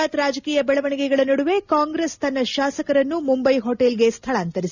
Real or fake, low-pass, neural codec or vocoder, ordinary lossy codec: real; 7.2 kHz; none; none